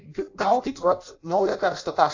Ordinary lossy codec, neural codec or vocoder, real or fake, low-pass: AAC, 48 kbps; codec, 16 kHz in and 24 kHz out, 0.6 kbps, FireRedTTS-2 codec; fake; 7.2 kHz